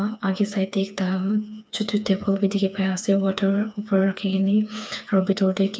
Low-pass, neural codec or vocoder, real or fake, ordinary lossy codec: none; codec, 16 kHz, 4 kbps, FreqCodec, smaller model; fake; none